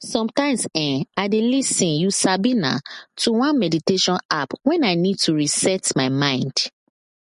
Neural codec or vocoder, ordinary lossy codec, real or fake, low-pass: none; MP3, 48 kbps; real; 10.8 kHz